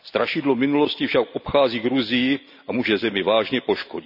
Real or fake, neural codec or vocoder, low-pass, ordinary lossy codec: real; none; 5.4 kHz; none